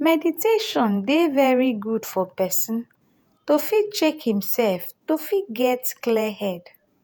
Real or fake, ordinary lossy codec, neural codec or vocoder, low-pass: fake; none; vocoder, 48 kHz, 128 mel bands, Vocos; none